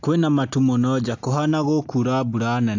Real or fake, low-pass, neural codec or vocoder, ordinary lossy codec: real; 7.2 kHz; none; AAC, 48 kbps